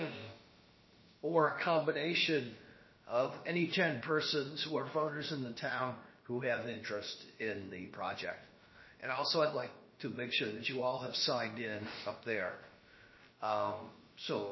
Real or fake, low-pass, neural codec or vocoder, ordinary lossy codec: fake; 7.2 kHz; codec, 16 kHz, about 1 kbps, DyCAST, with the encoder's durations; MP3, 24 kbps